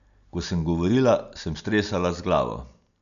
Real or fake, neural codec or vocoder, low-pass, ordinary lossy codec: real; none; 7.2 kHz; none